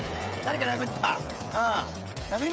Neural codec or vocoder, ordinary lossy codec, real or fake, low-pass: codec, 16 kHz, 16 kbps, FreqCodec, smaller model; none; fake; none